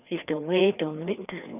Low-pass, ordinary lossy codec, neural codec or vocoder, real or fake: 3.6 kHz; none; codec, 16 kHz, 2 kbps, FreqCodec, larger model; fake